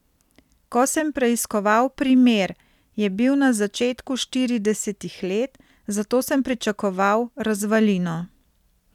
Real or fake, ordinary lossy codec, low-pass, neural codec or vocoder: fake; none; 19.8 kHz; vocoder, 44.1 kHz, 128 mel bands every 256 samples, BigVGAN v2